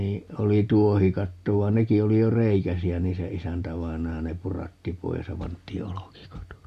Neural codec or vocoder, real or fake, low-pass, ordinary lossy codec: none; real; 14.4 kHz; Opus, 64 kbps